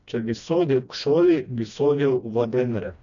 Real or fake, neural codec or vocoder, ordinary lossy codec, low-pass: fake; codec, 16 kHz, 1 kbps, FreqCodec, smaller model; none; 7.2 kHz